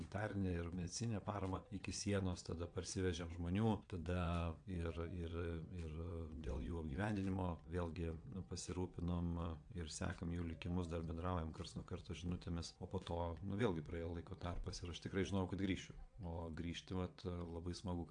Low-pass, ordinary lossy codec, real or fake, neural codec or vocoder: 9.9 kHz; AAC, 64 kbps; fake; vocoder, 22.05 kHz, 80 mel bands, WaveNeXt